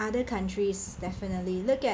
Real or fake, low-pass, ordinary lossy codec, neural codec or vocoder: real; none; none; none